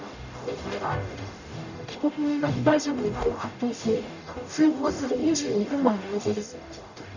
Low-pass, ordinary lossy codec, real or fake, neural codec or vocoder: 7.2 kHz; none; fake; codec, 44.1 kHz, 0.9 kbps, DAC